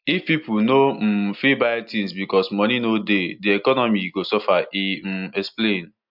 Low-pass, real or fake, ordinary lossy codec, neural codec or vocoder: 5.4 kHz; real; MP3, 48 kbps; none